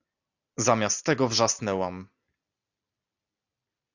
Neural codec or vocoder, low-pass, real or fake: none; 7.2 kHz; real